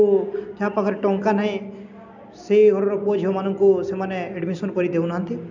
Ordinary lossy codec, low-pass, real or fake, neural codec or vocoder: MP3, 64 kbps; 7.2 kHz; real; none